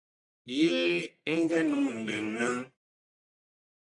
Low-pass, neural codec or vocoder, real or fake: 10.8 kHz; codec, 44.1 kHz, 1.7 kbps, Pupu-Codec; fake